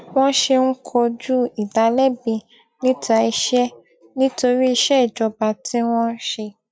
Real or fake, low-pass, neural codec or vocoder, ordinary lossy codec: real; none; none; none